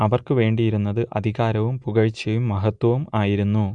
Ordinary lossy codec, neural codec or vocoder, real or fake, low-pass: none; none; real; 9.9 kHz